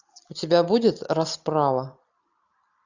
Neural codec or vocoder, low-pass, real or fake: none; 7.2 kHz; real